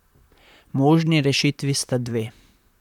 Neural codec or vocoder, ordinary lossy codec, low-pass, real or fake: vocoder, 44.1 kHz, 128 mel bands, Pupu-Vocoder; none; 19.8 kHz; fake